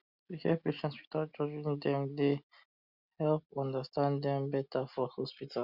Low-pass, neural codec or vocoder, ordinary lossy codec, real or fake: 5.4 kHz; none; Opus, 64 kbps; real